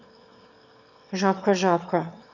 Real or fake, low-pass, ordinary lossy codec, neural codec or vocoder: fake; 7.2 kHz; none; autoencoder, 22.05 kHz, a latent of 192 numbers a frame, VITS, trained on one speaker